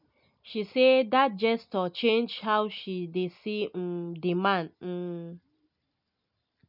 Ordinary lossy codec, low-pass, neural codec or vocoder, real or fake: none; 5.4 kHz; none; real